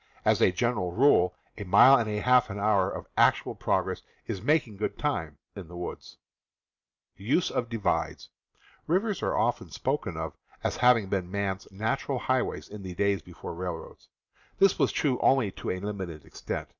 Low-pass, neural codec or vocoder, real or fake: 7.2 kHz; none; real